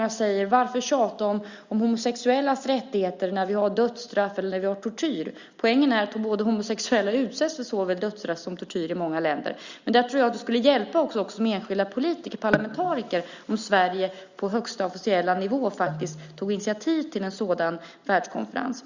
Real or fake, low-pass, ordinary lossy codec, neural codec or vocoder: real; 7.2 kHz; Opus, 64 kbps; none